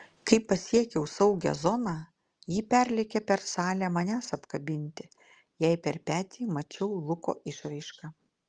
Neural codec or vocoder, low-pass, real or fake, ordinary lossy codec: none; 9.9 kHz; real; Opus, 24 kbps